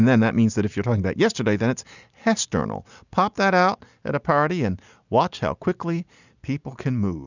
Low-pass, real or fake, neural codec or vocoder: 7.2 kHz; real; none